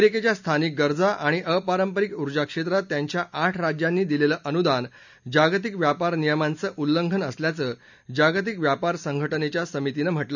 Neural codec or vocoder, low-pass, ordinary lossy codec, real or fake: none; 7.2 kHz; none; real